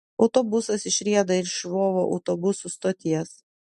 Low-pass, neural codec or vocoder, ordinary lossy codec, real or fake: 14.4 kHz; none; MP3, 48 kbps; real